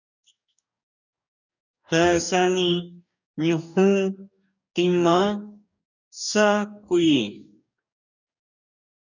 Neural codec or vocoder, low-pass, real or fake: codec, 44.1 kHz, 2.6 kbps, DAC; 7.2 kHz; fake